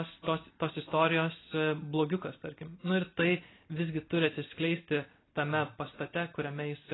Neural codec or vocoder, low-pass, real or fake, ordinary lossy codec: none; 7.2 kHz; real; AAC, 16 kbps